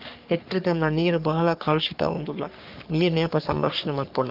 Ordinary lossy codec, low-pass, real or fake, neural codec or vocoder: Opus, 24 kbps; 5.4 kHz; fake; codec, 44.1 kHz, 3.4 kbps, Pupu-Codec